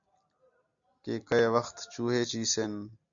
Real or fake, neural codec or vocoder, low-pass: real; none; 7.2 kHz